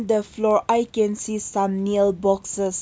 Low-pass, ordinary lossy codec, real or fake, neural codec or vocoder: none; none; real; none